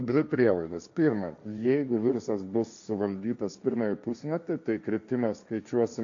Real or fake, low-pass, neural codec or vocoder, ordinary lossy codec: fake; 7.2 kHz; codec, 16 kHz, 1.1 kbps, Voila-Tokenizer; MP3, 64 kbps